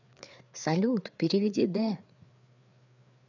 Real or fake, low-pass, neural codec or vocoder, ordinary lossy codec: fake; 7.2 kHz; codec, 16 kHz, 4 kbps, FreqCodec, larger model; none